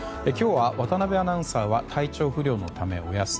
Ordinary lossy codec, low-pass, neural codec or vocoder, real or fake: none; none; none; real